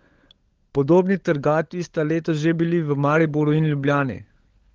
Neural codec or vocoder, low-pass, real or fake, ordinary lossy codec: codec, 16 kHz, 16 kbps, FunCodec, trained on LibriTTS, 50 frames a second; 7.2 kHz; fake; Opus, 16 kbps